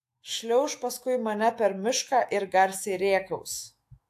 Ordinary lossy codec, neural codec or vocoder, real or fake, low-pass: AAC, 64 kbps; autoencoder, 48 kHz, 128 numbers a frame, DAC-VAE, trained on Japanese speech; fake; 14.4 kHz